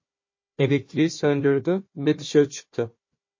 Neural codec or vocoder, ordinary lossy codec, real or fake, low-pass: codec, 16 kHz, 1 kbps, FunCodec, trained on Chinese and English, 50 frames a second; MP3, 32 kbps; fake; 7.2 kHz